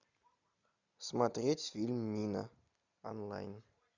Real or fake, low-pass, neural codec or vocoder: real; 7.2 kHz; none